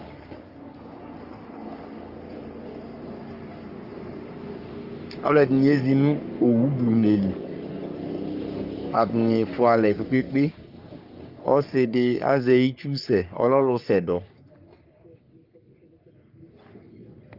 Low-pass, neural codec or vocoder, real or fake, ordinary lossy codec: 5.4 kHz; codec, 44.1 kHz, 3.4 kbps, Pupu-Codec; fake; Opus, 24 kbps